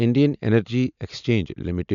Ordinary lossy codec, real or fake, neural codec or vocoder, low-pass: none; real; none; 7.2 kHz